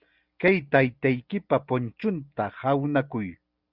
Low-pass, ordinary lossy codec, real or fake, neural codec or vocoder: 5.4 kHz; Opus, 64 kbps; real; none